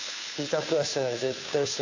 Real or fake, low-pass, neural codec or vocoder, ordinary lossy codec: fake; 7.2 kHz; codec, 16 kHz, 2 kbps, FunCodec, trained on Chinese and English, 25 frames a second; AAC, 48 kbps